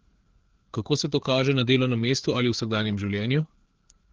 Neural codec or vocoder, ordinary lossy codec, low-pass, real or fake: codec, 16 kHz, 4 kbps, FreqCodec, larger model; Opus, 16 kbps; 7.2 kHz; fake